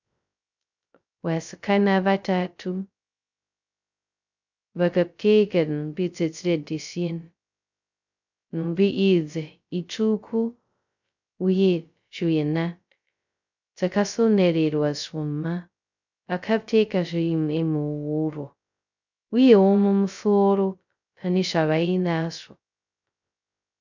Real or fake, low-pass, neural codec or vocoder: fake; 7.2 kHz; codec, 16 kHz, 0.2 kbps, FocalCodec